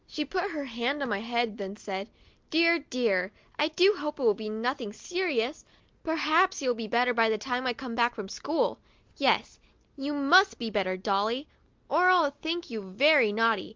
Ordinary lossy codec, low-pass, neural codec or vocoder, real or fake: Opus, 32 kbps; 7.2 kHz; none; real